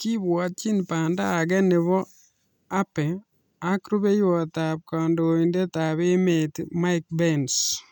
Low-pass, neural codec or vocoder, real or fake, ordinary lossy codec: 19.8 kHz; none; real; none